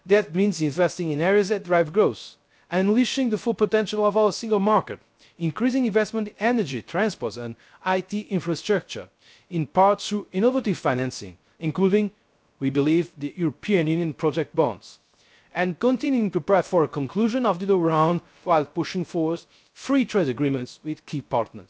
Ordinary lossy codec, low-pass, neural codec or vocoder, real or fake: none; none; codec, 16 kHz, 0.3 kbps, FocalCodec; fake